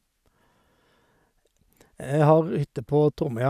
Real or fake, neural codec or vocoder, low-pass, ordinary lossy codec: real; none; 14.4 kHz; none